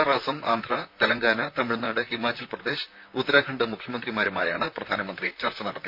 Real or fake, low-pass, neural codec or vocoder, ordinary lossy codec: fake; 5.4 kHz; vocoder, 44.1 kHz, 128 mel bands, Pupu-Vocoder; none